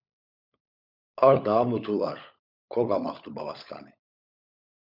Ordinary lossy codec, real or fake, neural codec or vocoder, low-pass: MP3, 48 kbps; fake; codec, 16 kHz, 16 kbps, FunCodec, trained on LibriTTS, 50 frames a second; 5.4 kHz